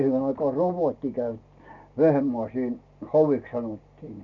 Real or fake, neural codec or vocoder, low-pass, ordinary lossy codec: real; none; 7.2 kHz; none